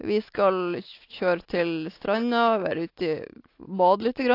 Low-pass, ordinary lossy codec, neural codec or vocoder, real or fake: 5.4 kHz; AAC, 32 kbps; none; real